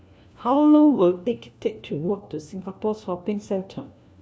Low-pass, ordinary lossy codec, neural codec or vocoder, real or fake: none; none; codec, 16 kHz, 1 kbps, FunCodec, trained on LibriTTS, 50 frames a second; fake